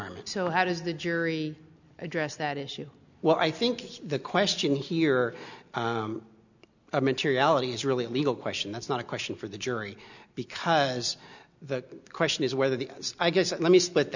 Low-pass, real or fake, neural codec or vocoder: 7.2 kHz; real; none